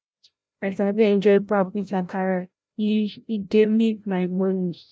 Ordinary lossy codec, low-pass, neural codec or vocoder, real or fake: none; none; codec, 16 kHz, 0.5 kbps, FreqCodec, larger model; fake